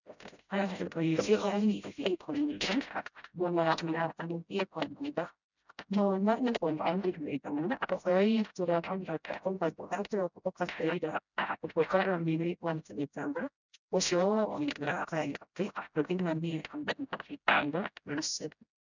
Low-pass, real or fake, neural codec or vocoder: 7.2 kHz; fake; codec, 16 kHz, 0.5 kbps, FreqCodec, smaller model